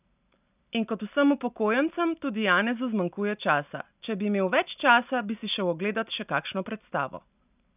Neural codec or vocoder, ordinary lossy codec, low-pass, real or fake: none; none; 3.6 kHz; real